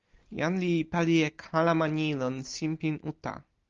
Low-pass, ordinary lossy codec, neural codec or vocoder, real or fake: 7.2 kHz; Opus, 32 kbps; none; real